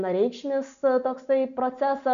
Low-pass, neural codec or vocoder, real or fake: 7.2 kHz; none; real